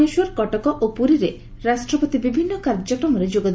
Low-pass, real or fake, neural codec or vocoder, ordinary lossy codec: none; real; none; none